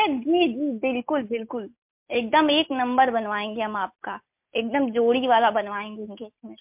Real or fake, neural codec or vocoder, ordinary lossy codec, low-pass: real; none; MP3, 32 kbps; 3.6 kHz